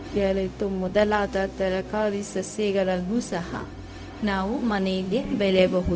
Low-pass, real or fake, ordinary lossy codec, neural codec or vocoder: none; fake; none; codec, 16 kHz, 0.4 kbps, LongCat-Audio-Codec